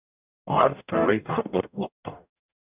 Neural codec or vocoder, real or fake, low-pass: codec, 44.1 kHz, 0.9 kbps, DAC; fake; 3.6 kHz